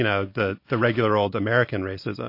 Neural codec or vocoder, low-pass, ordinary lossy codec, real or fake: none; 5.4 kHz; MP3, 32 kbps; real